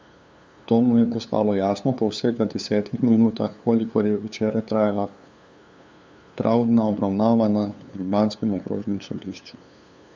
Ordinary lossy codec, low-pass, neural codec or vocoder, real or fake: none; none; codec, 16 kHz, 2 kbps, FunCodec, trained on LibriTTS, 25 frames a second; fake